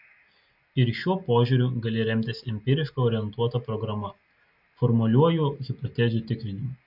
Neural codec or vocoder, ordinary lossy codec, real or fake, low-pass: none; AAC, 48 kbps; real; 5.4 kHz